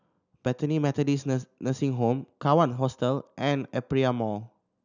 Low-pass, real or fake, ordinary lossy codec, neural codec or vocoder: 7.2 kHz; real; none; none